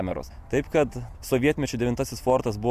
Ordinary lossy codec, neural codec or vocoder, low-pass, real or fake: AAC, 96 kbps; none; 14.4 kHz; real